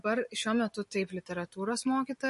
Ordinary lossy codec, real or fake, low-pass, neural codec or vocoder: MP3, 48 kbps; real; 14.4 kHz; none